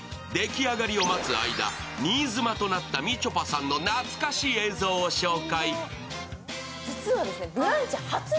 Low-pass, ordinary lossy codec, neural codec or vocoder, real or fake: none; none; none; real